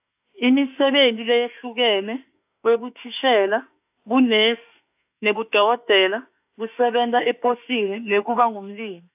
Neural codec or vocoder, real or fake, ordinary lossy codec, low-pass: autoencoder, 48 kHz, 32 numbers a frame, DAC-VAE, trained on Japanese speech; fake; AAC, 32 kbps; 3.6 kHz